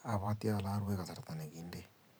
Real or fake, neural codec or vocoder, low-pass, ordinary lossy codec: fake; vocoder, 44.1 kHz, 128 mel bands every 512 samples, BigVGAN v2; none; none